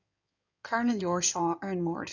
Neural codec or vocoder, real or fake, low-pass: codec, 16 kHz in and 24 kHz out, 2.2 kbps, FireRedTTS-2 codec; fake; 7.2 kHz